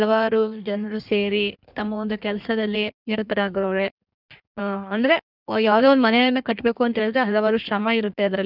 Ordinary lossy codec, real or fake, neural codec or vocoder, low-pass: none; fake; codec, 16 kHz in and 24 kHz out, 1.1 kbps, FireRedTTS-2 codec; 5.4 kHz